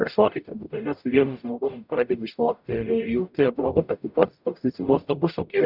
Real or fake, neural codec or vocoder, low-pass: fake; codec, 44.1 kHz, 0.9 kbps, DAC; 5.4 kHz